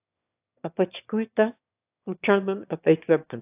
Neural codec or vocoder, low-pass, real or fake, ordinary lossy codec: autoencoder, 22.05 kHz, a latent of 192 numbers a frame, VITS, trained on one speaker; 3.6 kHz; fake; AAC, 32 kbps